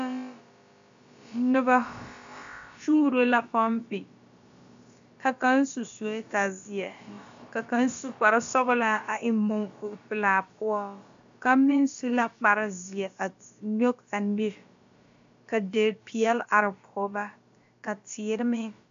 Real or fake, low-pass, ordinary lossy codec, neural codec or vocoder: fake; 7.2 kHz; MP3, 64 kbps; codec, 16 kHz, about 1 kbps, DyCAST, with the encoder's durations